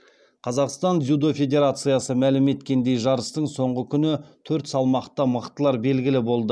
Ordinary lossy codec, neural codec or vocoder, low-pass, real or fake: none; none; none; real